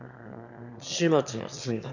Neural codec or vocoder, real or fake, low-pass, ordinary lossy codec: autoencoder, 22.05 kHz, a latent of 192 numbers a frame, VITS, trained on one speaker; fake; 7.2 kHz; none